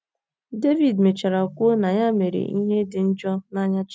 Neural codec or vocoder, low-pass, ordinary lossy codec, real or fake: none; none; none; real